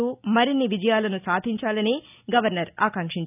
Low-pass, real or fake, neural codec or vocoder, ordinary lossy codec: 3.6 kHz; real; none; none